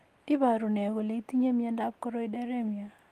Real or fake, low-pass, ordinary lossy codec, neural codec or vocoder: real; 14.4 kHz; Opus, 24 kbps; none